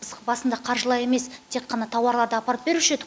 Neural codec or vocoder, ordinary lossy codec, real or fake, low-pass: none; none; real; none